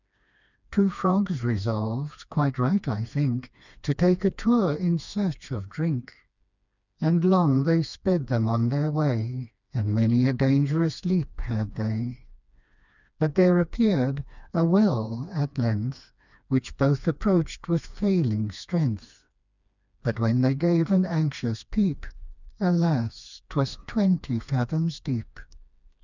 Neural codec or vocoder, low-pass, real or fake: codec, 16 kHz, 2 kbps, FreqCodec, smaller model; 7.2 kHz; fake